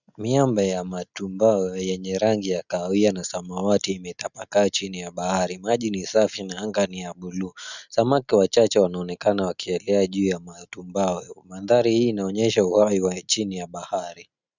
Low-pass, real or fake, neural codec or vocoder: 7.2 kHz; real; none